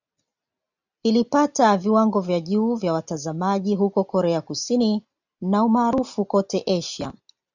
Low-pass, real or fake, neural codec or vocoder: 7.2 kHz; real; none